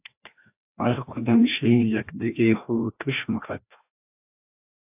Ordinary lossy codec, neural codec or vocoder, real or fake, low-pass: AAC, 32 kbps; codec, 16 kHz, 1 kbps, FreqCodec, larger model; fake; 3.6 kHz